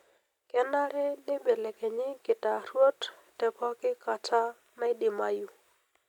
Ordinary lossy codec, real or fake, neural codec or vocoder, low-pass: none; real; none; 19.8 kHz